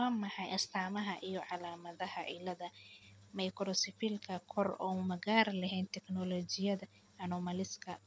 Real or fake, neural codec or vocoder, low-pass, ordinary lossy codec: real; none; none; none